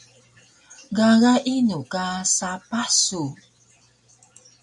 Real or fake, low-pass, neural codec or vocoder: real; 10.8 kHz; none